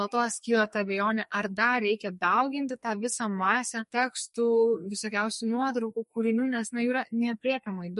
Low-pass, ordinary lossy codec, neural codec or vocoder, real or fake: 14.4 kHz; MP3, 48 kbps; codec, 44.1 kHz, 2.6 kbps, SNAC; fake